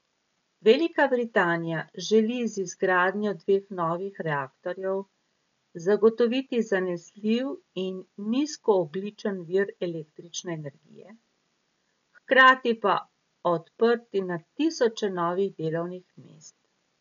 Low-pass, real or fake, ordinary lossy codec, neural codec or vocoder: 7.2 kHz; real; none; none